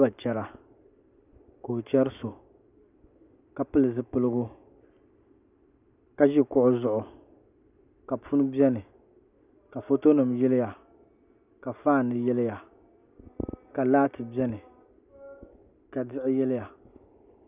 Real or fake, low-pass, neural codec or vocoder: real; 3.6 kHz; none